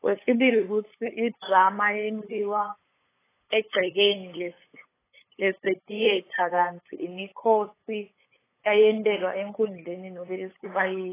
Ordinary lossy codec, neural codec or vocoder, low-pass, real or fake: AAC, 16 kbps; codec, 16 kHz, 8 kbps, FunCodec, trained on LibriTTS, 25 frames a second; 3.6 kHz; fake